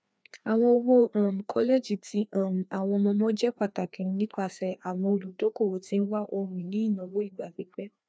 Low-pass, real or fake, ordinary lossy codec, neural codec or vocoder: none; fake; none; codec, 16 kHz, 2 kbps, FreqCodec, larger model